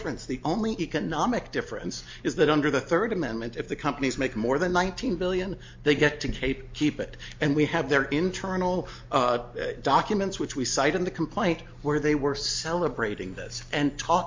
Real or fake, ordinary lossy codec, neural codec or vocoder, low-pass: real; MP3, 48 kbps; none; 7.2 kHz